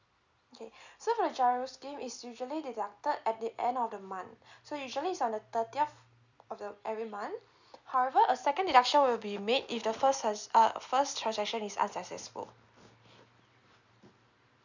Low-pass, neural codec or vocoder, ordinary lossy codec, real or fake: 7.2 kHz; none; none; real